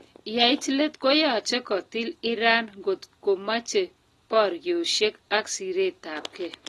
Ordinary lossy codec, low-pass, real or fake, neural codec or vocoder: AAC, 32 kbps; 19.8 kHz; real; none